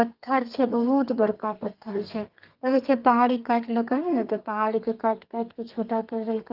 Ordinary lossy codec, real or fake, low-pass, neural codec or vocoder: Opus, 24 kbps; fake; 5.4 kHz; codec, 32 kHz, 1.9 kbps, SNAC